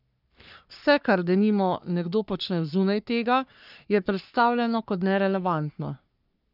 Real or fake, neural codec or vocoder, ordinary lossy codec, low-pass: fake; codec, 44.1 kHz, 3.4 kbps, Pupu-Codec; none; 5.4 kHz